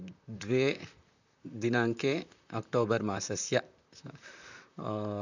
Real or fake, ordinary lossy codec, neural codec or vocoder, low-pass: fake; none; vocoder, 44.1 kHz, 128 mel bands, Pupu-Vocoder; 7.2 kHz